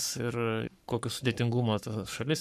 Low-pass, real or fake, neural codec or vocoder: 14.4 kHz; fake; codec, 44.1 kHz, 7.8 kbps, Pupu-Codec